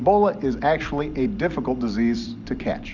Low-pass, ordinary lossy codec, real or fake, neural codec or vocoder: 7.2 kHz; Opus, 64 kbps; real; none